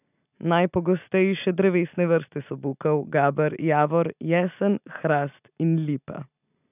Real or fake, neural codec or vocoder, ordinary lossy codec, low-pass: real; none; none; 3.6 kHz